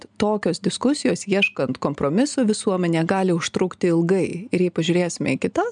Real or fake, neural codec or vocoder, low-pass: real; none; 9.9 kHz